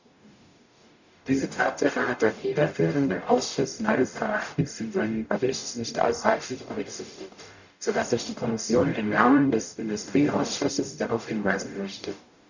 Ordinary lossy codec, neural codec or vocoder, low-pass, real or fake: none; codec, 44.1 kHz, 0.9 kbps, DAC; 7.2 kHz; fake